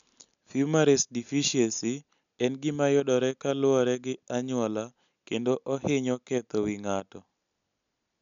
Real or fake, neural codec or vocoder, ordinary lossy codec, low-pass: real; none; none; 7.2 kHz